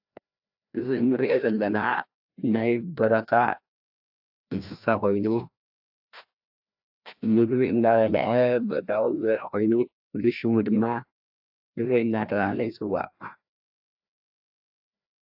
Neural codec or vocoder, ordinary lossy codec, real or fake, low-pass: codec, 16 kHz, 1 kbps, FreqCodec, larger model; AAC, 48 kbps; fake; 5.4 kHz